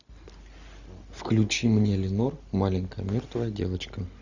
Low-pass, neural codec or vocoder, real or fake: 7.2 kHz; none; real